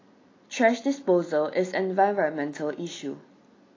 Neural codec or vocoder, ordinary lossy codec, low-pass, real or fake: none; AAC, 32 kbps; 7.2 kHz; real